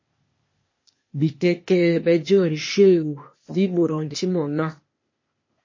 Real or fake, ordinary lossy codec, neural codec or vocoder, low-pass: fake; MP3, 32 kbps; codec, 16 kHz, 0.8 kbps, ZipCodec; 7.2 kHz